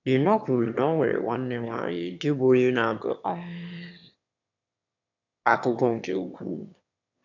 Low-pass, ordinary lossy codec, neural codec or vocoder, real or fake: 7.2 kHz; none; autoencoder, 22.05 kHz, a latent of 192 numbers a frame, VITS, trained on one speaker; fake